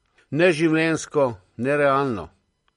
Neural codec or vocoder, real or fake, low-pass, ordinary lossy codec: none; real; 19.8 kHz; MP3, 48 kbps